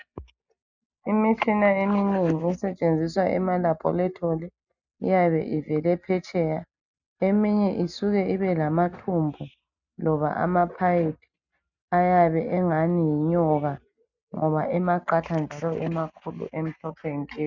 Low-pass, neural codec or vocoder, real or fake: 7.2 kHz; none; real